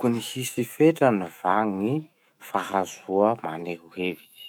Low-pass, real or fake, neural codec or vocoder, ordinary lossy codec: 19.8 kHz; fake; autoencoder, 48 kHz, 128 numbers a frame, DAC-VAE, trained on Japanese speech; none